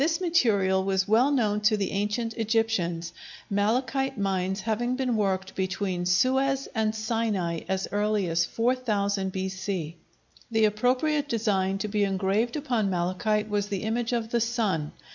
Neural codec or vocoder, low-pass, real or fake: none; 7.2 kHz; real